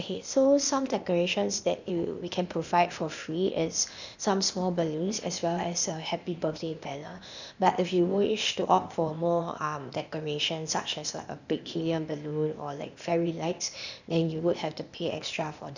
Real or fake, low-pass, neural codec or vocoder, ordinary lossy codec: fake; 7.2 kHz; codec, 16 kHz, 0.8 kbps, ZipCodec; none